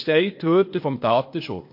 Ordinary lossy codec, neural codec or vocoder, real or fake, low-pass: MP3, 32 kbps; codec, 16 kHz, 0.8 kbps, ZipCodec; fake; 5.4 kHz